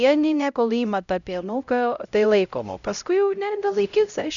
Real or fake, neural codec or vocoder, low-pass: fake; codec, 16 kHz, 0.5 kbps, X-Codec, HuBERT features, trained on LibriSpeech; 7.2 kHz